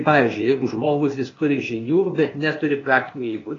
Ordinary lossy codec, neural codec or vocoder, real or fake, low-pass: AAC, 32 kbps; codec, 16 kHz, 0.8 kbps, ZipCodec; fake; 7.2 kHz